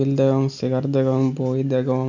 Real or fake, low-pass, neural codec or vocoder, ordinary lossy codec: real; 7.2 kHz; none; none